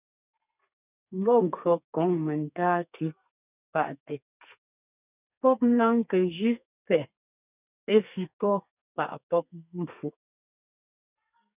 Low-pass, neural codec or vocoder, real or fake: 3.6 kHz; codec, 32 kHz, 1.9 kbps, SNAC; fake